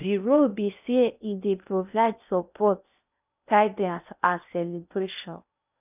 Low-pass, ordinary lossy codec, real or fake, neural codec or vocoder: 3.6 kHz; none; fake; codec, 16 kHz in and 24 kHz out, 0.6 kbps, FocalCodec, streaming, 2048 codes